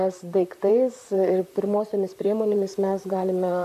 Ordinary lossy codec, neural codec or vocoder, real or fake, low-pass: AAC, 96 kbps; vocoder, 44.1 kHz, 128 mel bands, Pupu-Vocoder; fake; 14.4 kHz